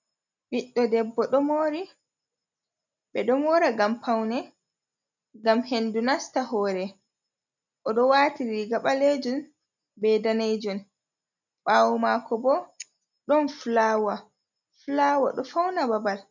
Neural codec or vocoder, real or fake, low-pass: none; real; 7.2 kHz